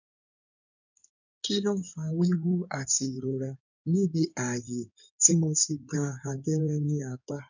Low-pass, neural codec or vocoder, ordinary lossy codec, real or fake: 7.2 kHz; codec, 16 kHz in and 24 kHz out, 2.2 kbps, FireRedTTS-2 codec; none; fake